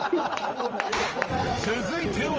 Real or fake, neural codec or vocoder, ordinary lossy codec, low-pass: fake; vocoder, 44.1 kHz, 80 mel bands, Vocos; Opus, 24 kbps; 7.2 kHz